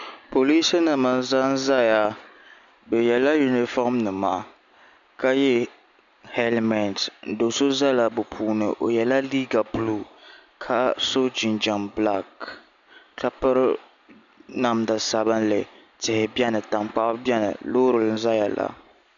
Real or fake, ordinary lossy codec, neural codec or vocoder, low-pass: real; MP3, 96 kbps; none; 7.2 kHz